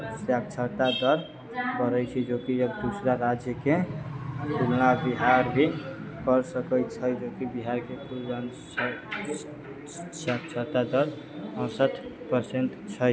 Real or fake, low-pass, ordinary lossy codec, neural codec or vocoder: real; none; none; none